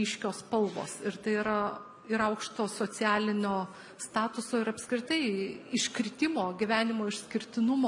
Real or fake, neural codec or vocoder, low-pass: real; none; 10.8 kHz